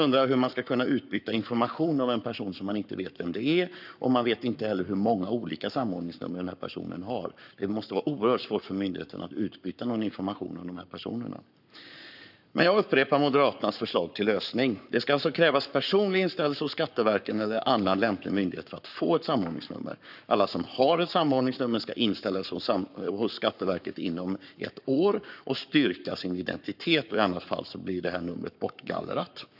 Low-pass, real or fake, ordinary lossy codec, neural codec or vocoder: 5.4 kHz; fake; none; codec, 44.1 kHz, 7.8 kbps, Pupu-Codec